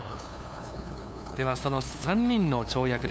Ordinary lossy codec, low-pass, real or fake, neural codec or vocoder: none; none; fake; codec, 16 kHz, 2 kbps, FunCodec, trained on LibriTTS, 25 frames a second